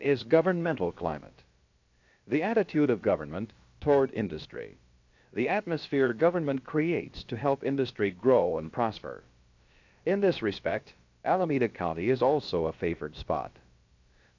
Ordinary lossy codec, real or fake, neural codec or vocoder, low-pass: AAC, 48 kbps; fake; codec, 16 kHz, about 1 kbps, DyCAST, with the encoder's durations; 7.2 kHz